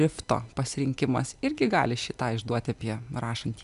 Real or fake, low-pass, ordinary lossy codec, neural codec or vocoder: real; 10.8 kHz; MP3, 96 kbps; none